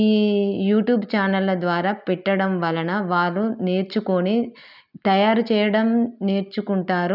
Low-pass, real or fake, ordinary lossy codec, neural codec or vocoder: 5.4 kHz; real; none; none